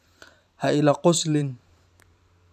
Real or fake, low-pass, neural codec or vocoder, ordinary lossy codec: fake; 14.4 kHz; vocoder, 44.1 kHz, 128 mel bands every 512 samples, BigVGAN v2; none